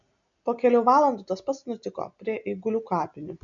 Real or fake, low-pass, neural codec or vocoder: real; 7.2 kHz; none